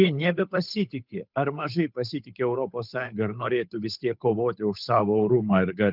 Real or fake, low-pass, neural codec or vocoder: fake; 5.4 kHz; codec, 16 kHz, 8 kbps, FunCodec, trained on Chinese and English, 25 frames a second